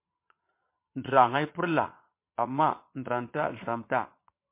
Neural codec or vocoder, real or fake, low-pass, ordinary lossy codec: vocoder, 22.05 kHz, 80 mel bands, Vocos; fake; 3.6 kHz; MP3, 24 kbps